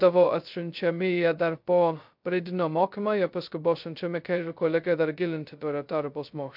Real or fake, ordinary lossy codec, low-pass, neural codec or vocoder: fake; MP3, 48 kbps; 5.4 kHz; codec, 16 kHz, 0.2 kbps, FocalCodec